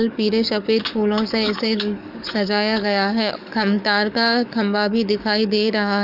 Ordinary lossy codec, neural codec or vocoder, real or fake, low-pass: none; codec, 44.1 kHz, 7.8 kbps, DAC; fake; 5.4 kHz